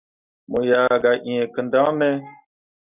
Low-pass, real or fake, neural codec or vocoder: 3.6 kHz; real; none